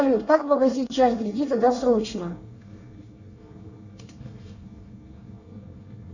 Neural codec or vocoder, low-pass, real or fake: codec, 24 kHz, 1 kbps, SNAC; 7.2 kHz; fake